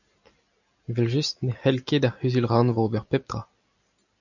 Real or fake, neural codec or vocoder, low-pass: real; none; 7.2 kHz